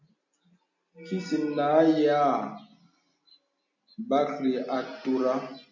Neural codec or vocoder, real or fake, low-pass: none; real; 7.2 kHz